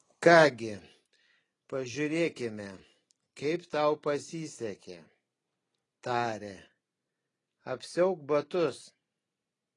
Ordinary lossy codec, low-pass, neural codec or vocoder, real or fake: AAC, 32 kbps; 10.8 kHz; none; real